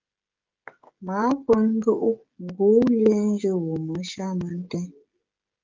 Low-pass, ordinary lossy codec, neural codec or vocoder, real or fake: 7.2 kHz; Opus, 32 kbps; codec, 16 kHz, 16 kbps, FreqCodec, smaller model; fake